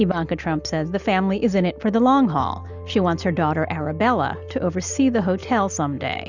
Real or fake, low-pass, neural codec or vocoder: real; 7.2 kHz; none